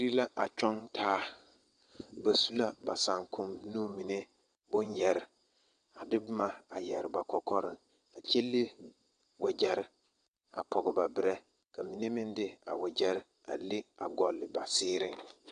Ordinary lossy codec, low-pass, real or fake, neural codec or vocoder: AAC, 96 kbps; 9.9 kHz; fake; vocoder, 22.05 kHz, 80 mel bands, WaveNeXt